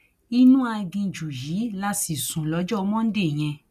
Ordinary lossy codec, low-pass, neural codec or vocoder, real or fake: none; 14.4 kHz; none; real